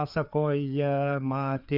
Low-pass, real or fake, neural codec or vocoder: 5.4 kHz; fake; codec, 16 kHz, 4 kbps, FreqCodec, larger model